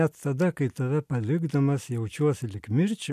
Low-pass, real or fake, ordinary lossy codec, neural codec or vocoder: 14.4 kHz; real; MP3, 96 kbps; none